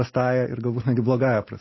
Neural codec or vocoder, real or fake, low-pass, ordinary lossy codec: none; real; 7.2 kHz; MP3, 24 kbps